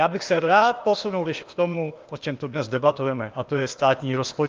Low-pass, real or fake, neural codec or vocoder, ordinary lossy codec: 7.2 kHz; fake; codec, 16 kHz, 0.8 kbps, ZipCodec; Opus, 24 kbps